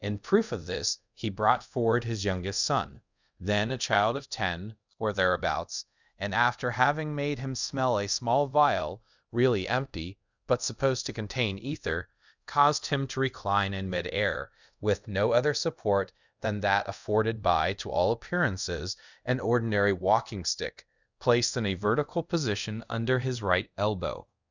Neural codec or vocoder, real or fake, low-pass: codec, 24 kHz, 0.5 kbps, DualCodec; fake; 7.2 kHz